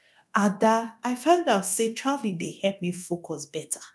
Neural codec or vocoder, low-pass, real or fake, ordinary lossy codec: codec, 24 kHz, 0.9 kbps, DualCodec; none; fake; none